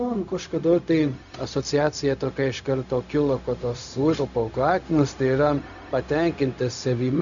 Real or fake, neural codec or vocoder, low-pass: fake; codec, 16 kHz, 0.4 kbps, LongCat-Audio-Codec; 7.2 kHz